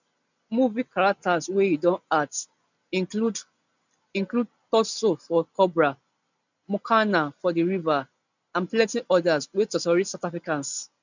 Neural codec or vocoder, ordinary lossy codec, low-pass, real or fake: none; none; 7.2 kHz; real